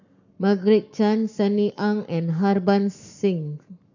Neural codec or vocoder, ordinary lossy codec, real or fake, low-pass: codec, 44.1 kHz, 7.8 kbps, Pupu-Codec; none; fake; 7.2 kHz